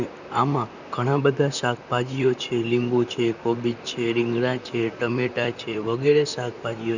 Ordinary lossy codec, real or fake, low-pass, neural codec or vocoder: none; fake; 7.2 kHz; vocoder, 44.1 kHz, 128 mel bands, Pupu-Vocoder